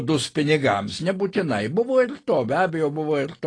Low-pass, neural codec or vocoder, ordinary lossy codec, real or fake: 9.9 kHz; none; AAC, 32 kbps; real